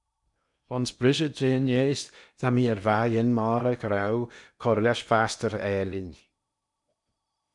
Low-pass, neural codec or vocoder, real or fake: 10.8 kHz; codec, 16 kHz in and 24 kHz out, 0.8 kbps, FocalCodec, streaming, 65536 codes; fake